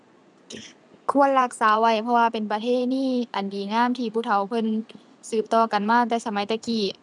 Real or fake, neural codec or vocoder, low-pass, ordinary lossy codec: fake; vocoder, 24 kHz, 100 mel bands, Vocos; none; none